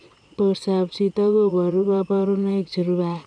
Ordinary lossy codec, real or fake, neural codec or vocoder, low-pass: none; fake; vocoder, 22.05 kHz, 80 mel bands, Vocos; 9.9 kHz